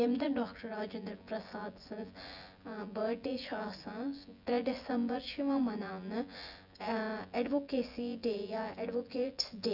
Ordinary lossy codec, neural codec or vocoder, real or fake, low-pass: none; vocoder, 24 kHz, 100 mel bands, Vocos; fake; 5.4 kHz